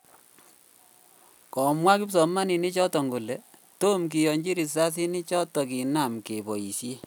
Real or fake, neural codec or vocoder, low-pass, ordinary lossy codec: real; none; none; none